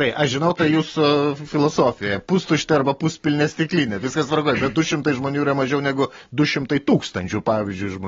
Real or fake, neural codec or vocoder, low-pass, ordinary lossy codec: real; none; 7.2 kHz; AAC, 24 kbps